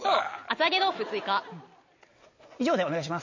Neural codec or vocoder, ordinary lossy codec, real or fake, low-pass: none; MP3, 32 kbps; real; 7.2 kHz